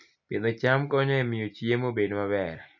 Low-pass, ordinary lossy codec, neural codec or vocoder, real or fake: 7.2 kHz; none; none; real